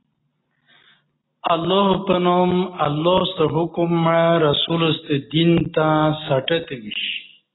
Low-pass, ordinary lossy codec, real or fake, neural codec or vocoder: 7.2 kHz; AAC, 16 kbps; real; none